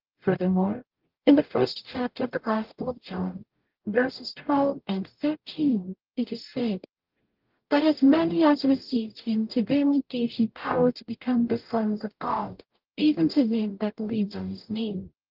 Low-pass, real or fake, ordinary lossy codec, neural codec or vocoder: 5.4 kHz; fake; Opus, 24 kbps; codec, 44.1 kHz, 0.9 kbps, DAC